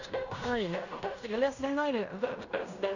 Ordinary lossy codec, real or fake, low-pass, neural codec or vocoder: none; fake; 7.2 kHz; codec, 16 kHz in and 24 kHz out, 0.9 kbps, LongCat-Audio-Codec, fine tuned four codebook decoder